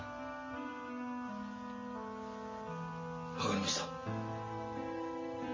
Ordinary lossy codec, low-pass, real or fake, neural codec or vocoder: MP3, 32 kbps; 7.2 kHz; real; none